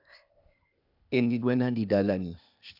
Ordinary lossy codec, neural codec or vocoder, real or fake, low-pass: none; codec, 16 kHz, 0.8 kbps, ZipCodec; fake; 5.4 kHz